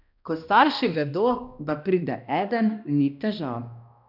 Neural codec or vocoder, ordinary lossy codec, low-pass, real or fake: codec, 16 kHz, 1 kbps, X-Codec, HuBERT features, trained on balanced general audio; none; 5.4 kHz; fake